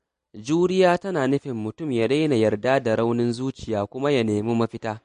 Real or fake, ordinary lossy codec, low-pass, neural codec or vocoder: real; MP3, 48 kbps; 14.4 kHz; none